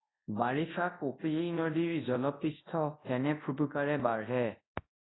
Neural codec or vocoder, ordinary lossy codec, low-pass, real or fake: codec, 24 kHz, 0.9 kbps, WavTokenizer, large speech release; AAC, 16 kbps; 7.2 kHz; fake